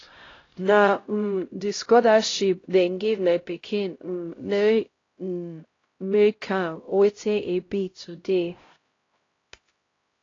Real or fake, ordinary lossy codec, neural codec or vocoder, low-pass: fake; AAC, 32 kbps; codec, 16 kHz, 0.5 kbps, X-Codec, HuBERT features, trained on LibriSpeech; 7.2 kHz